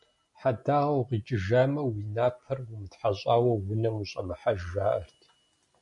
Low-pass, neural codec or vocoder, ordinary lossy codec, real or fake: 10.8 kHz; none; MP3, 64 kbps; real